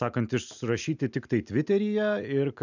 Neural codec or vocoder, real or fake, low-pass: none; real; 7.2 kHz